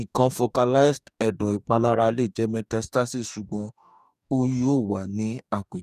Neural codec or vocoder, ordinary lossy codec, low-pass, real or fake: codec, 44.1 kHz, 2.6 kbps, DAC; none; 14.4 kHz; fake